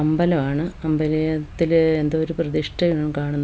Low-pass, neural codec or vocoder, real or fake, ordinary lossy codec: none; none; real; none